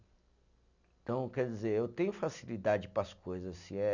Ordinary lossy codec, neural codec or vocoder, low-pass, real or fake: none; none; 7.2 kHz; real